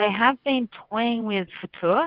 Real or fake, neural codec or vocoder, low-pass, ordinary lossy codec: fake; vocoder, 22.05 kHz, 80 mel bands, WaveNeXt; 5.4 kHz; Opus, 64 kbps